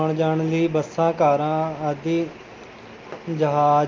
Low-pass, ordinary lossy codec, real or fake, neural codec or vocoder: 7.2 kHz; Opus, 24 kbps; real; none